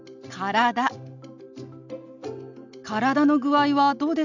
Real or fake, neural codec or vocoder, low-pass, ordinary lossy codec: real; none; 7.2 kHz; none